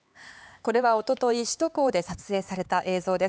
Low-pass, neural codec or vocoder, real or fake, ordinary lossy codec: none; codec, 16 kHz, 4 kbps, X-Codec, HuBERT features, trained on LibriSpeech; fake; none